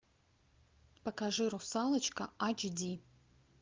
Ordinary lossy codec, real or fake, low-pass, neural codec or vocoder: Opus, 32 kbps; real; 7.2 kHz; none